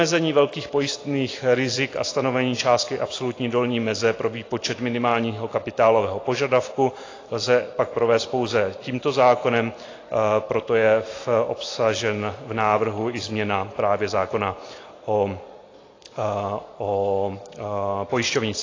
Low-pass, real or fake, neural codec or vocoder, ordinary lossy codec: 7.2 kHz; real; none; AAC, 32 kbps